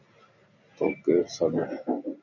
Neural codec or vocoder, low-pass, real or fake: none; 7.2 kHz; real